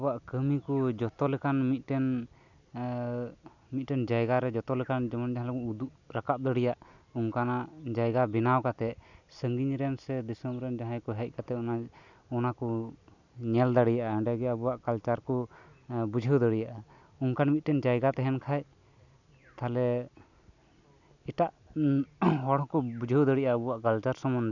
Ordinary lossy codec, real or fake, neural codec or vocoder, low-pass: none; real; none; 7.2 kHz